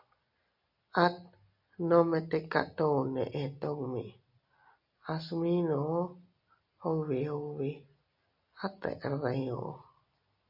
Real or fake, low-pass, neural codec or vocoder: real; 5.4 kHz; none